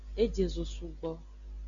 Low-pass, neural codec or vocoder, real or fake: 7.2 kHz; none; real